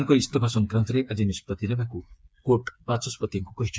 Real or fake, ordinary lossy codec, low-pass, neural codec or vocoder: fake; none; none; codec, 16 kHz, 4 kbps, FreqCodec, smaller model